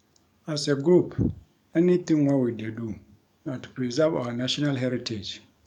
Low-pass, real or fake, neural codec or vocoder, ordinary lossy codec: 19.8 kHz; fake; codec, 44.1 kHz, 7.8 kbps, DAC; none